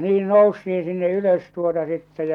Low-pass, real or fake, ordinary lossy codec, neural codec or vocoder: 19.8 kHz; real; none; none